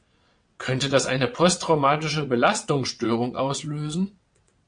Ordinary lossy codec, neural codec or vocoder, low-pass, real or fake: MP3, 48 kbps; vocoder, 22.05 kHz, 80 mel bands, WaveNeXt; 9.9 kHz; fake